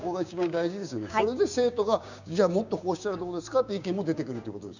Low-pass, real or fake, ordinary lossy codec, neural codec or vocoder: 7.2 kHz; fake; none; codec, 16 kHz, 6 kbps, DAC